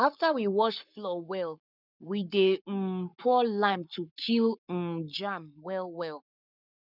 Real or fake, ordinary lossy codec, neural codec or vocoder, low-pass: fake; none; codec, 44.1 kHz, 7.8 kbps, Pupu-Codec; 5.4 kHz